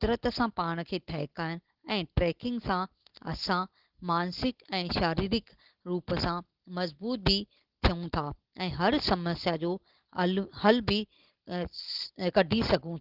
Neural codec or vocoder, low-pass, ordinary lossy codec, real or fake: none; 5.4 kHz; Opus, 16 kbps; real